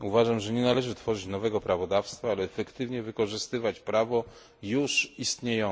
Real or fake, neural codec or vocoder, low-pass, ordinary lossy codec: real; none; none; none